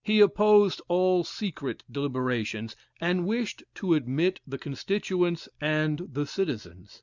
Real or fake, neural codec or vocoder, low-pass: real; none; 7.2 kHz